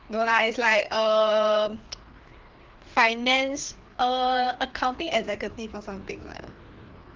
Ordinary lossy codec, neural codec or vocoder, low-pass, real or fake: Opus, 16 kbps; codec, 16 kHz, 2 kbps, FreqCodec, larger model; 7.2 kHz; fake